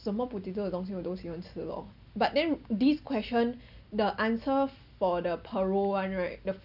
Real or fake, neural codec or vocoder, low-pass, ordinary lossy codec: real; none; 5.4 kHz; none